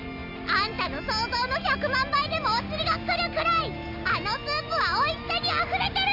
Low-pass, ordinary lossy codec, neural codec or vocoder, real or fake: 5.4 kHz; none; none; real